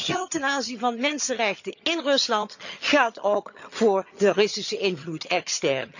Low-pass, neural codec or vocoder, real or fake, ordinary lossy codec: 7.2 kHz; vocoder, 22.05 kHz, 80 mel bands, HiFi-GAN; fake; none